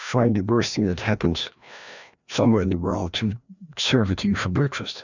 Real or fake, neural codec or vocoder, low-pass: fake; codec, 16 kHz, 1 kbps, FreqCodec, larger model; 7.2 kHz